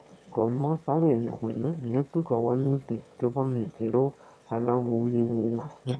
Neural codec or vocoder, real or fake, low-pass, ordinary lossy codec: autoencoder, 22.05 kHz, a latent of 192 numbers a frame, VITS, trained on one speaker; fake; none; none